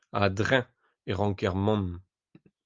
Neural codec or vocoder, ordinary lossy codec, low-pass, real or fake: none; Opus, 32 kbps; 7.2 kHz; real